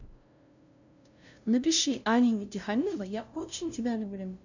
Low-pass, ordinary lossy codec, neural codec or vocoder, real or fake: 7.2 kHz; none; codec, 16 kHz, 0.5 kbps, FunCodec, trained on LibriTTS, 25 frames a second; fake